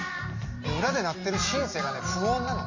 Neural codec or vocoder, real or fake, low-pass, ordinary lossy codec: none; real; 7.2 kHz; MP3, 32 kbps